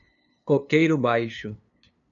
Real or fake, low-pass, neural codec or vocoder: fake; 7.2 kHz; codec, 16 kHz, 2 kbps, FunCodec, trained on LibriTTS, 25 frames a second